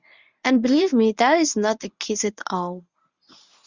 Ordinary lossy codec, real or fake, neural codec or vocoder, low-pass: Opus, 64 kbps; fake; codec, 24 kHz, 0.9 kbps, WavTokenizer, medium speech release version 1; 7.2 kHz